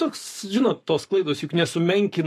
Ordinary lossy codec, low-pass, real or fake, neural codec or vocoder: MP3, 64 kbps; 14.4 kHz; fake; codec, 44.1 kHz, 7.8 kbps, Pupu-Codec